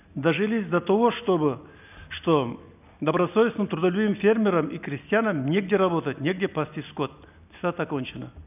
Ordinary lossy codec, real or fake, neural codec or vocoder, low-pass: none; real; none; 3.6 kHz